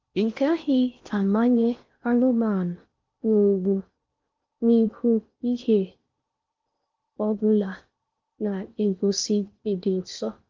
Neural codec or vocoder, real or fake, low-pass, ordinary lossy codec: codec, 16 kHz in and 24 kHz out, 0.6 kbps, FocalCodec, streaming, 4096 codes; fake; 7.2 kHz; Opus, 32 kbps